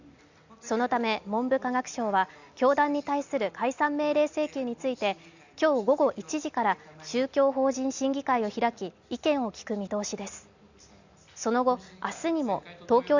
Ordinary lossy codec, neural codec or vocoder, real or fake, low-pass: Opus, 64 kbps; none; real; 7.2 kHz